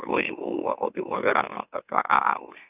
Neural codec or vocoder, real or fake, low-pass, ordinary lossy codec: autoencoder, 44.1 kHz, a latent of 192 numbers a frame, MeloTTS; fake; 3.6 kHz; none